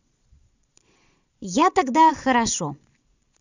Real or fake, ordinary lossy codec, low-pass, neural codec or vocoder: fake; none; 7.2 kHz; vocoder, 22.05 kHz, 80 mel bands, Vocos